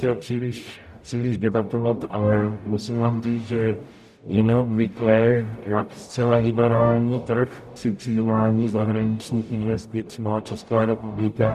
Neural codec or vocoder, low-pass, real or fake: codec, 44.1 kHz, 0.9 kbps, DAC; 14.4 kHz; fake